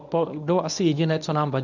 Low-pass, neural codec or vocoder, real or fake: 7.2 kHz; codec, 24 kHz, 0.9 kbps, WavTokenizer, medium speech release version 1; fake